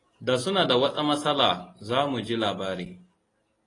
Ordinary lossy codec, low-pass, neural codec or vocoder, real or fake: AAC, 32 kbps; 10.8 kHz; none; real